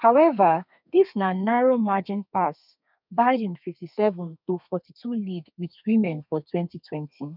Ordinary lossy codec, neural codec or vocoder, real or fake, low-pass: none; codec, 32 kHz, 1.9 kbps, SNAC; fake; 5.4 kHz